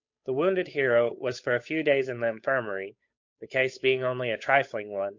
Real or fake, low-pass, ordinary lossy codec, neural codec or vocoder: fake; 7.2 kHz; MP3, 48 kbps; codec, 16 kHz, 8 kbps, FunCodec, trained on Chinese and English, 25 frames a second